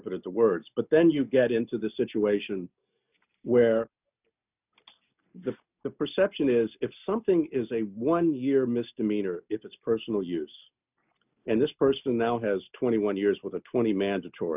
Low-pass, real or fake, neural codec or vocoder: 3.6 kHz; real; none